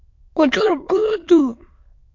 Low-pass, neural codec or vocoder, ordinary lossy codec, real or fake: 7.2 kHz; autoencoder, 22.05 kHz, a latent of 192 numbers a frame, VITS, trained on many speakers; MP3, 48 kbps; fake